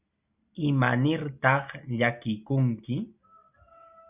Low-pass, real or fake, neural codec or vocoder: 3.6 kHz; real; none